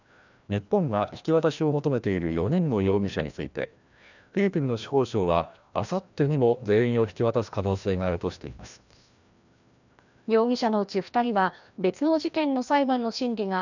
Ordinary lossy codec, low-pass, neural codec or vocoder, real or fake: none; 7.2 kHz; codec, 16 kHz, 1 kbps, FreqCodec, larger model; fake